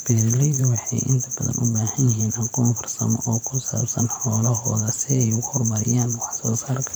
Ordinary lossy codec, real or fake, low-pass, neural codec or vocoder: none; fake; none; vocoder, 44.1 kHz, 128 mel bands every 512 samples, BigVGAN v2